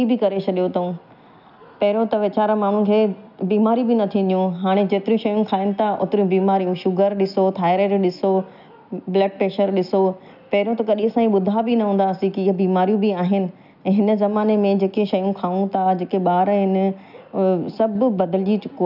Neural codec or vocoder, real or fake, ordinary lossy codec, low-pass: none; real; none; 5.4 kHz